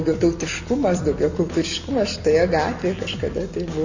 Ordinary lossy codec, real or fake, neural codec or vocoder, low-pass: Opus, 64 kbps; real; none; 7.2 kHz